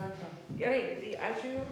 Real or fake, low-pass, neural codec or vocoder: fake; 19.8 kHz; codec, 44.1 kHz, 7.8 kbps, DAC